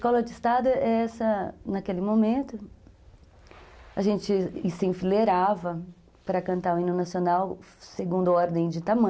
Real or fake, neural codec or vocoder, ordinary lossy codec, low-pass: real; none; none; none